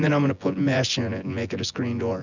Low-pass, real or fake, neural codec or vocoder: 7.2 kHz; fake; vocoder, 24 kHz, 100 mel bands, Vocos